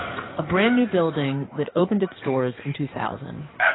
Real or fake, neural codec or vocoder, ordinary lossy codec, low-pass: fake; codec, 16 kHz in and 24 kHz out, 2.2 kbps, FireRedTTS-2 codec; AAC, 16 kbps; 7.2 kHz